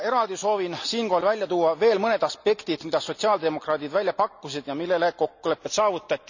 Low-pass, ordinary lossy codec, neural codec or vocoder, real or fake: 7.2 kHz; none; none; real